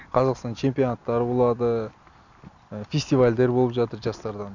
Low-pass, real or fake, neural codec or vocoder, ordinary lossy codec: 7.2 kHz; real; none; none